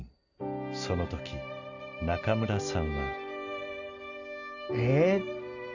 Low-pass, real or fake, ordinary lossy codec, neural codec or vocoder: 7.2 kHz; real; none; none